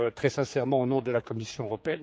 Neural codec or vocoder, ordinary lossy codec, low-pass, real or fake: codec, 16 kHz, 4 kbps, X-Codec, HuBERT features, trained on general audio; none; none; fake